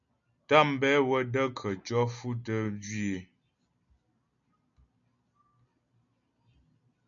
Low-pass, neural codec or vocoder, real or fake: 7.2 kHz; none; real